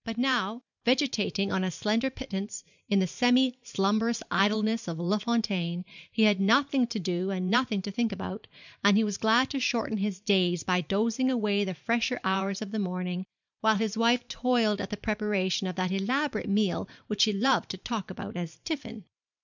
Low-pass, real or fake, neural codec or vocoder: 7.2 kHz; fake; vocoder, 44.1 kHz, 128 mel bands every 512 samples, BigVGAN v2